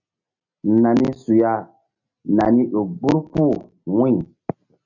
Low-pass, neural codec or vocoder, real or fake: 7.2 kHz; none; real